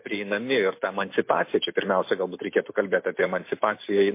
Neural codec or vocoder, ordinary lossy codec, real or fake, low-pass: none; MP3, 24 kbps; real; 3.6 kHz